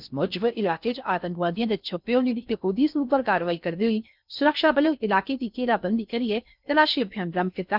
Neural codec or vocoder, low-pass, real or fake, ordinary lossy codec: codec, 16 kHz in and 24 kHz out, 0.6 kbps, FocalCodec, streaming, 4096 codes; 5.4 kHz; fake; none